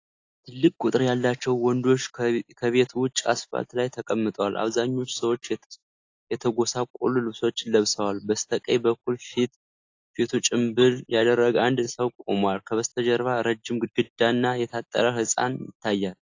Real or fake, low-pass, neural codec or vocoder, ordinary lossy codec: real; 7.2 kHz; none; AAC, 48 kbps